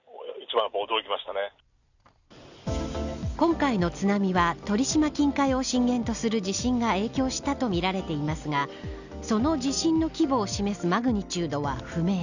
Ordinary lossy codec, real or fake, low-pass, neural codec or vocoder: none; real; 7.2 kHz; none